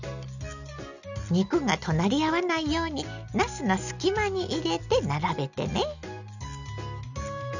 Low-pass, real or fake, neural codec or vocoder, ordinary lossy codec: 7.2 kHz; real; none; none